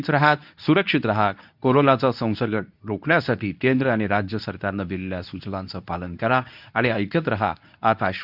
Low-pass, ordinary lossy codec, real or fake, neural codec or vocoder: 5.4 kHz; none; fake; codec, 24 kHz, 0.9 kbps, WavTokenizer, medium speech release version 2